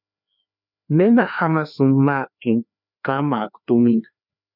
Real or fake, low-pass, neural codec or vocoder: fake; 5.4 kHz; codec, 16 kHz, 2 kbps, FreqCodec, larger model